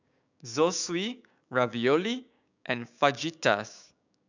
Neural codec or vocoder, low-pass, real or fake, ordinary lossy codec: codec, 16 kHz, 6 kbps, DAC; 7.2 kHz; fake; none